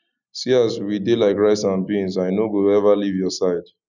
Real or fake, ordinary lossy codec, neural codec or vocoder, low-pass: real; none; none; 7.2 kHz